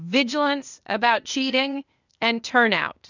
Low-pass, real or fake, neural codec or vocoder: 7.2 kHz; fake; codec, 16 kHz, 0.8 kbps, ZipCodec